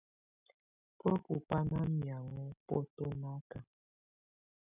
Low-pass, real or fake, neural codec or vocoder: 3.6 kHz; real; none